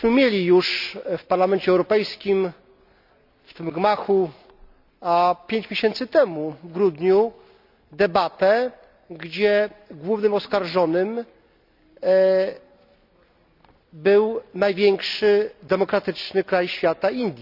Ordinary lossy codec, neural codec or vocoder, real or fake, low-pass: none; none; real; 5.4 kHz